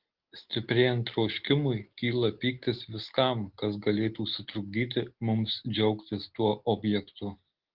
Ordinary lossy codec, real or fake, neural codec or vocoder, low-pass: Opus, 16 kbps; real; none; 5.4 kHz